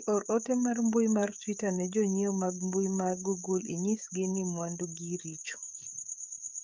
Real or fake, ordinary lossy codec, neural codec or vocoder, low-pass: fake; Opus, 24 kbps; codec, 16 kHz, 16 kbps, FreqCodec, smaller model; 7.2 kHz